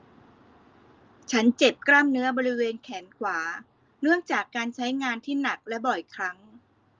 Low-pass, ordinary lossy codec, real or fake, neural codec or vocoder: 7.2 kHz; Opus, 24 kbps; real; none